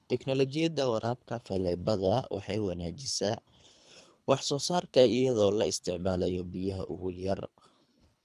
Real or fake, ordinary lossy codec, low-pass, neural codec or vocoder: fake; none; none; codec, 24 kHz, 3 kbps, HILCodec